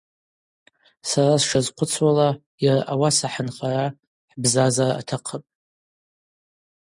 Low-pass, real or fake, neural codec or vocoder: 10.8 kHz; real; none